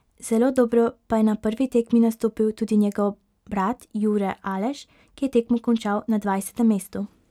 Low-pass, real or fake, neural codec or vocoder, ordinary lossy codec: 19.8 kHz; real; none; none